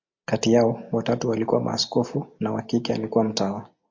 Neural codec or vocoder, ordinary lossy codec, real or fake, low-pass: none; MP3, 48 kbps; real; 7.2 kHz